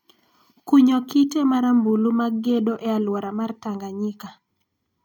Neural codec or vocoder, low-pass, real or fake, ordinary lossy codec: none; 19.8 kHz; real; none